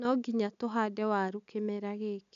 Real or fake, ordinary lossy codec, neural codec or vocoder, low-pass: real; none; none; 7.2 kHz